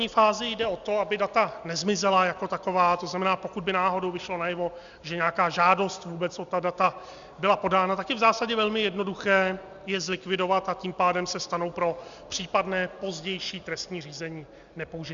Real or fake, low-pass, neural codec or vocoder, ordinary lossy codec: real; 7.2 kHz; none; Opus, 64 kbps